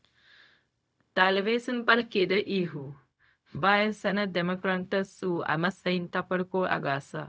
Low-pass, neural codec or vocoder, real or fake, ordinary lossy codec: none; codec, 16 kHz, 0.4 kbps, LongCat-Audio-Codec; fake; none